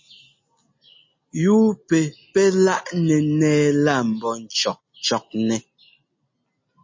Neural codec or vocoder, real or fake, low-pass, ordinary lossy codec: none; real; 7.2 kHz; MP3, 32 kbps